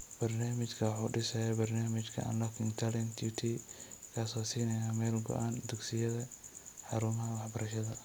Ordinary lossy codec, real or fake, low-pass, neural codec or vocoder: none; real; none; none